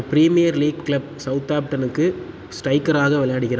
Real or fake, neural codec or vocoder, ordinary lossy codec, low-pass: real; none; none; none